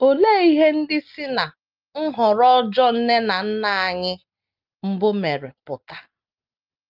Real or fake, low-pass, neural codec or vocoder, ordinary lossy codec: real; 5.4 kHz; none; Opus, 32 kbps